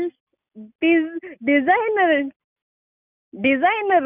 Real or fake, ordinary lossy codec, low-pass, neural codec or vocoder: real; none; 3.6 kHz; none